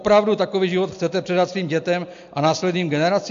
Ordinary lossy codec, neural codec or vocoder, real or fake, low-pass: AAC, 64 kbps; none; real; 7.2 kHz